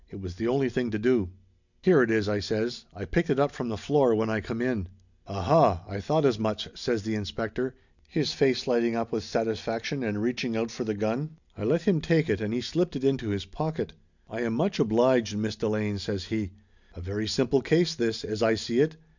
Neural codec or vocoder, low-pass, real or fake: none; 7.2 kHz; real